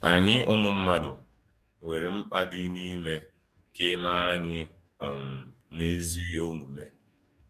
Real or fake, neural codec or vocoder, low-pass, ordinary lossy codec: fake; codec, 44.1 kHz, 2.6 kbps, DAC; 14.4 kHz; MP3, 96 kbps